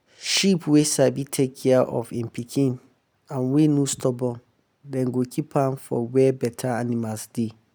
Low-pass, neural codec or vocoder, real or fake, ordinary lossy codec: none; none; real; none